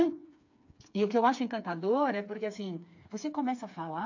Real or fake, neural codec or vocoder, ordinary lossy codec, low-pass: fake; codec, 16 kHz, 4 kbps, FreqCodec, smaller model; AAC, 48 kbps; 7.2 kHz